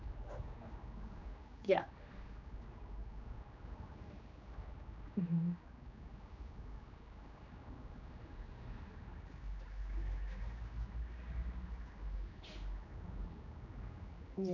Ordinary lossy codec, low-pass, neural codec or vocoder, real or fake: none; 7.2 kHz; codec, 16 kHz, 2 kbps, X-Codec, HuBERT features, trained on general audio; fake